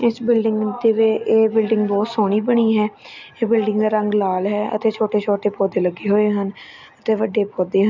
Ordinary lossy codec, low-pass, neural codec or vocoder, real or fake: none; 7.2 kHz; none; real